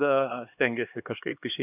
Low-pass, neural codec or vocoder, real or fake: 3.6 kHz; codec, 16 kHz, 2 kbps, X-Codec, HuBERT features, trained on LibriSpeech; fake